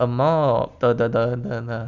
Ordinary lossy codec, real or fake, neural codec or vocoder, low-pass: none; real; none; 7.2 kHz